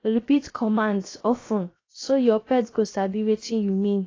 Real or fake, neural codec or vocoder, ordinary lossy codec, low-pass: fake; codec, 16 kHz, 0.7 kbps, FocalCodec; AAC, 32 kbps; 7.2 kHz